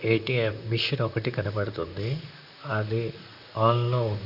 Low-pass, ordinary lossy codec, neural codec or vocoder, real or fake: 5.4 kHz; none; vocoder, 44.1 kHz, 128 mel bands, Pupu-Vocoder; fake